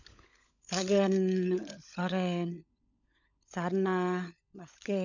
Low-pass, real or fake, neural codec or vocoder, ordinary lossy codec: 7.2 kHz; fake; codec, 16 kHz, 16 kbps, FunCodec, trained on LibriTTS, 50 frames a second; none